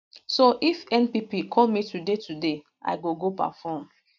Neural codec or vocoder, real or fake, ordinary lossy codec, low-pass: none; real; none; 7.2 kHz